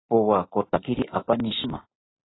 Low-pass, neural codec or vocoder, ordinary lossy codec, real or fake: 7.2 kHz; none; AAC, 16 kbps; real